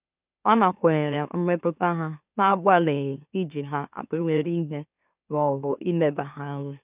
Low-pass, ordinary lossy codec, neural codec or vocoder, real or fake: 3.6 kHz; none; autoencoder, 44.1 kHz, a latent of 192 numbers a frame, MeloTTS; fake